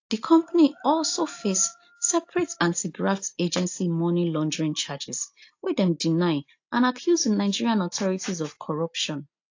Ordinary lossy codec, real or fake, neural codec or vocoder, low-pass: AAC, 48 kbps; real; none; 7.2 kHz